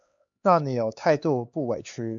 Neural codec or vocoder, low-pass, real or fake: codec, 16 kHz, 4 kbps, X-Codec, HuBERT features, trained on LibriSpeech; 7.2 kHz; fake